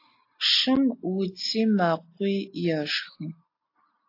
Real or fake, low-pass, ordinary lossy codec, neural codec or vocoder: real; 5.4 kHz; AAC, 32 kbps; none